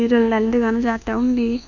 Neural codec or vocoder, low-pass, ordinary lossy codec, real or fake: codec, 24 kHz, 1.2 kbps, DualCodec; 7.2 kHz; none; fake